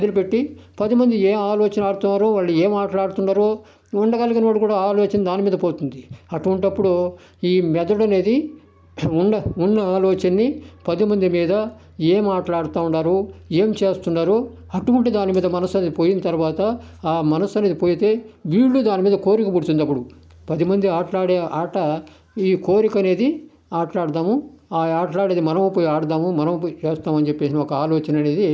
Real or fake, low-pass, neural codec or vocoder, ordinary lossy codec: real; none; none; none